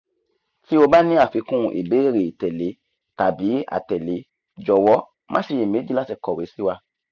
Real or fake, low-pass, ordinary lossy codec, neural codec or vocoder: real; 7.2 kHz; none; none